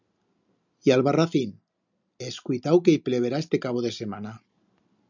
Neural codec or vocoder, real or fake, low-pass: none; real; 7.2 kHz